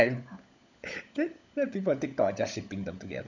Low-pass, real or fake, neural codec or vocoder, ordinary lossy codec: 7.2 kHz; fake; codec, 16 kHz, 16 kbps, FunCodec, trained on LibriTTS, 50 frames a second; none